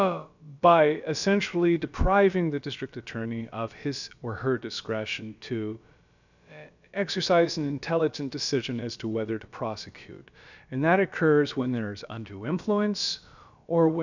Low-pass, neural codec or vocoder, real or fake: 7.2 kHz; codec, 16 kHz, about 1 kbps, DyCAST, with the encoder's durations; fake